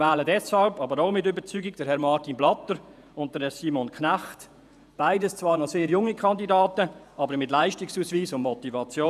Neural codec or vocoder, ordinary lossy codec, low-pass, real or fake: vocoder, 48 kHz, 128 mel bands, Vocos; none; 14.4 kHz; fake